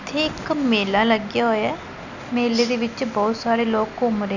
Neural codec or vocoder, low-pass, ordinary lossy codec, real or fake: none; 7.2 kHz; none; real